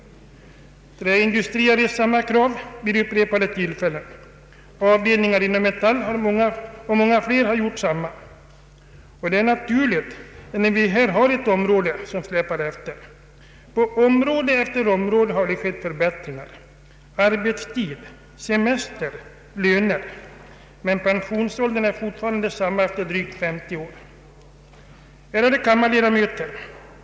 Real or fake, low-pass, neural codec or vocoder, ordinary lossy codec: real; none; none; none